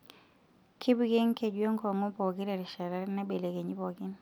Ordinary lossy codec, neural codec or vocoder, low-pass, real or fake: none; none; 19.8 kHz; real